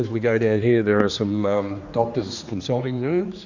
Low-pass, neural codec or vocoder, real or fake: 7.2 kHz; codec, 16 kHz, 2 kbps, X-Codec, HuBERT features, trained on general audio; fake